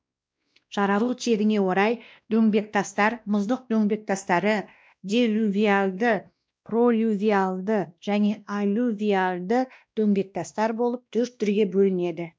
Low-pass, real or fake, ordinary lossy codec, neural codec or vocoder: none; fake; none; codec, 16 kHz, 1 kbps, X-Codec, WavLM features, trained on Multilingual LibriSpeech